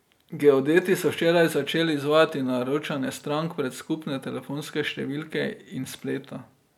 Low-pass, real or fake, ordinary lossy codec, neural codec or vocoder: 19.8 kHz; real; none; none